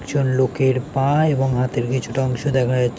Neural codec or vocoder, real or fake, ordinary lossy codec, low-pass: none; real; none; none